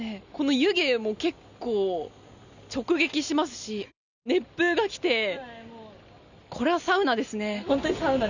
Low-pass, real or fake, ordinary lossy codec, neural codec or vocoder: 7.2 kHz; real; none; none